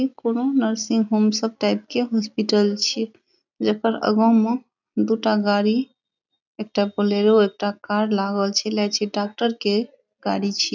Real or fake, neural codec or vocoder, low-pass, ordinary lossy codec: real; none; 7.2 kHz; none